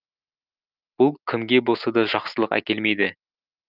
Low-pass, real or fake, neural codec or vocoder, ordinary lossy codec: 5.4 kHz; real; none; Opus, 24 kbps